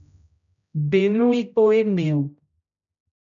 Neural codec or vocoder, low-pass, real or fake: codec, 16 kHz, 0.5 kbps, X-Codec, HuBERT features, trained on general audio; 7.2 kHz; fake